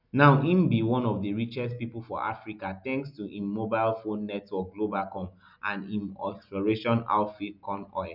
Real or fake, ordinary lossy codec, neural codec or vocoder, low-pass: real; none; none; 5.4 kHz